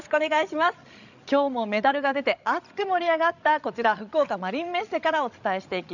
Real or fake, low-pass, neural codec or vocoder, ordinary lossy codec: fake; 7.2 kHz; codec, 16 kHz, 16 kbps, FreqCodec, larger model; none